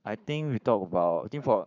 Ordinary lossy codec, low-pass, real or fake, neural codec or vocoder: none; 7.2 kHz; fake; codec, 44.1 kHz, 7.8 kbps, Pupu-Codec